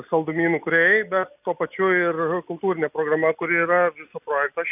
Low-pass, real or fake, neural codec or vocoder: 3.6 kHz; real; none